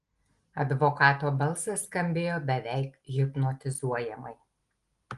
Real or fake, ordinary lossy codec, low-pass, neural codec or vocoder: real; Opus, 24 kbps; 10.8 kHz; none